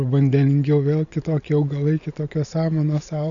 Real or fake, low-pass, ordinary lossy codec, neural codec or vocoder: real; 7.2 kHz; MP3, 96 kbps; none